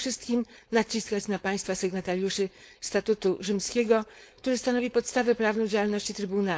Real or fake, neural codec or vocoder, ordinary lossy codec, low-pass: fake; codec, 16 kHz, 4.8 kbps, FACodec; none; none